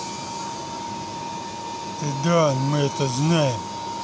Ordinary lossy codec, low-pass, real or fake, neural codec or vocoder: none; none; real; none